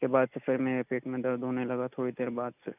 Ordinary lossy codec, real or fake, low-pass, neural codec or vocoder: none; real; 3.6 kHz; none